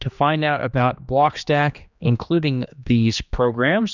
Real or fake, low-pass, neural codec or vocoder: fake; 7.2 kHz; codec, 16 kHz, 2 kbps, X-Codec, HuBERT features, trained on general audio